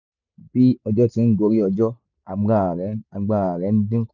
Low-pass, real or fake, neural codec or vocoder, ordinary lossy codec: 7.2 kHz; real; none; none